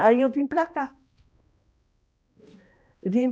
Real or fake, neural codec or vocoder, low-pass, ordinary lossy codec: fake; codec, 16 kHz, 1 kbps, X-Codec, HuBERT features, trained on balanced general audio; none; none